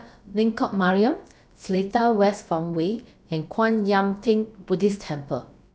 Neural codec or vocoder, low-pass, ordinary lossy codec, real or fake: codec, 16 kHz, about 1 kbps, DyCAST, with the encoder's durations; none; none; fake